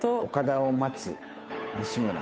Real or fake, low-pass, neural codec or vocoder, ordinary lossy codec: fake; none; codec, 16 kHz, 8 kbps, FunCodec, trained on Chinese and English, 25 frames a second; none